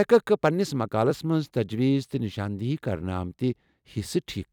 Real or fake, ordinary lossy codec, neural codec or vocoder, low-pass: fake; none; vocoder, 44.1 kHz, 128 mel bands every 256 samples, BigVGAN v2; 19.8 kHz